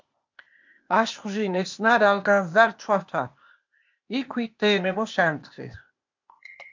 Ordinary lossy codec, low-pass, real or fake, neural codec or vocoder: MP3, 48 kbps; 7.2 kHz; fake; codec, 16 kHz, 0.8 kbps, ZipCodec